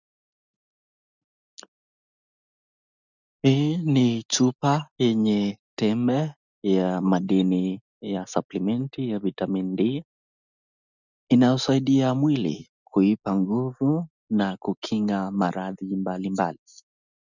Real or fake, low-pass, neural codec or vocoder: real; 7.2 kHz; none